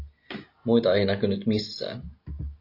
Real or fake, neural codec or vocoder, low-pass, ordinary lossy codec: real; none; 5.4 kHz; AAC, 32 kbps